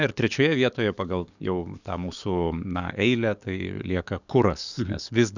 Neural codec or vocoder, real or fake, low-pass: codec, 44.1 kHz, 7.8 kbps, DAC; fake; 7.2 kHz